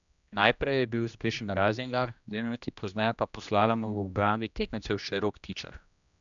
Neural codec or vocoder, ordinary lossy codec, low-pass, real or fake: codec, 16 kHz, 1 kbps, X-Codec, HuBERT features, trained on general audio; none; 7.2 kHz; fake